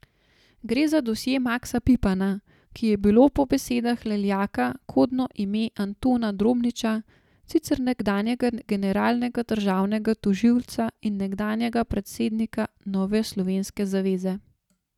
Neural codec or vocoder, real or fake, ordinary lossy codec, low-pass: none; real; none; 19.8 kHz